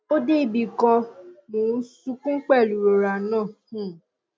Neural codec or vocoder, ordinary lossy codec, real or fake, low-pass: none; none; real; none